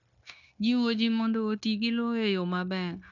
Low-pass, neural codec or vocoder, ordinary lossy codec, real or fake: 7.2 kHz; codec, 16 kHz, 0.9 kbps, LongCat-Audio-Codec; none; fake